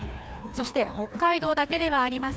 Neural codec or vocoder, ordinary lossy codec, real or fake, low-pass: codec, 16 kHz, 2 kbps, FreqCodec, larger model; none; fake; none